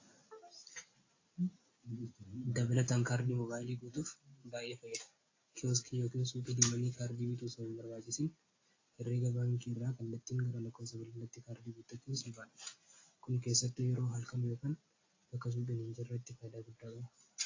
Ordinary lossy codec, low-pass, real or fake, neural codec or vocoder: AAC, 32 kbps; 7.2 kHz; real; none